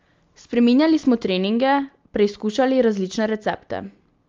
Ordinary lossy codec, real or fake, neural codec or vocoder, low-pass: Opus, 32 kbps; real; none; 7.2 kHz